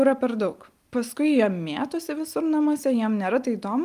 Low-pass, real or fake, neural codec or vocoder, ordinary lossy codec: 14.4 kHz; real; none; Opus, 32 kbps